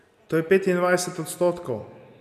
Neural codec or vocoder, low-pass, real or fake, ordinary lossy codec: none; 14.4 kHz; real; none